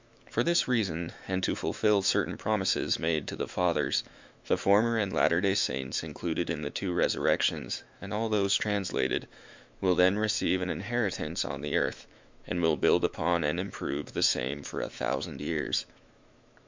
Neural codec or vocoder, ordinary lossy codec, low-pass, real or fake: autoencoder, 48 kHz, 128 numbers a frame, DAC-VAE, trained on Japanese speech; MP3, 64 kbps; 7.2 kHz; fake